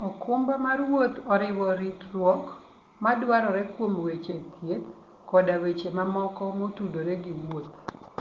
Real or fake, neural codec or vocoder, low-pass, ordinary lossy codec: real; none; 7.2 kHz; Opus, 16 kbps